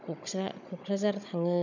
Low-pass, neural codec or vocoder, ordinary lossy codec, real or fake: 7.2 kHz; codec, 16 kHz, 16 kbps, FunCodec, trained on Chinese and English, 50 frames a second; none; fake